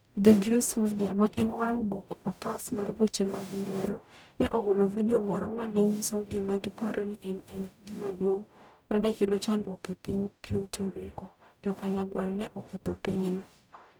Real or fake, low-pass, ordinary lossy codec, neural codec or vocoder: fake; none; none; codec, 44.1 kHz, 0.9 kbps, DAC